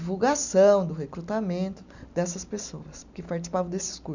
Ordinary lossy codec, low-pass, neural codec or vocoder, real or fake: none; 7.2 kHz; none; real